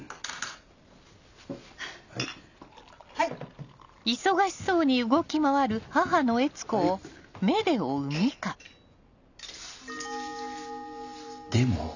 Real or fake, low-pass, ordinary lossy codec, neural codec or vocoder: real; 7.2 kHz; none; none